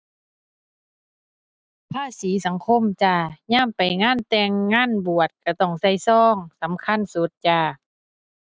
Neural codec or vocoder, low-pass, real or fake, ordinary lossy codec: none; none; real; none